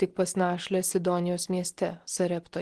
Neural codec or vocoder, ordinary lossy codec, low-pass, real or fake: none; Opus, 16 kbps; 10.8 kHz; real